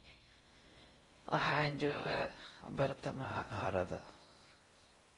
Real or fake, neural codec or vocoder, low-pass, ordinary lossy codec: fake; codec, 16 kHz in and 24 kHz out, 0.6 kbps, FocalCodec, streaming, 4096 codes; 10.8 kHz; AAC, 32 kbps